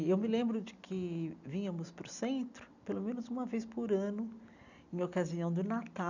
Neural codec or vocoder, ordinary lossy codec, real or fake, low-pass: none; none; real; 7.2 kHz